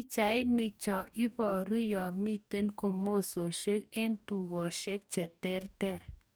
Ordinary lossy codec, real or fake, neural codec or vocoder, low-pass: none; fake; codec, 44.1 kHz, 2.6 kbps, DAC; none